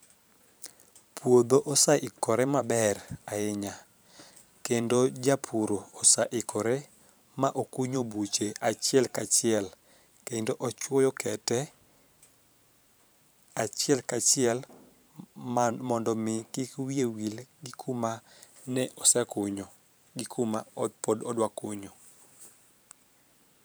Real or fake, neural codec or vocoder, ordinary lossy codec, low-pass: fake; vocoder, 44.1 kHz, 128 mel bands every 512 samples, BigVGAN v2; none; none